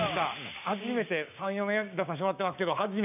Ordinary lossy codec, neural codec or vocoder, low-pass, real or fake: none; codec, 16 kHz, 6 kbps, DAC; 3.6 kHz; fake